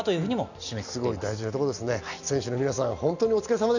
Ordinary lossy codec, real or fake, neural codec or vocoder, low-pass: none; real; none; 7.2 kHz